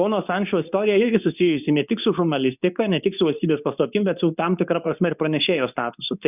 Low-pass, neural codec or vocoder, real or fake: 3.6 kHz; codec, 24 kHz, 0.9 kbps, WavTokenizer, medium speech release version 2; fake